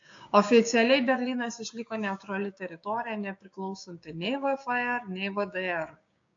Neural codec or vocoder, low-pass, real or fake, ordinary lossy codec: codec, 16 kHz, 6 kbps, DAC; 7.2 kHz; fake; AAC, 48 kbps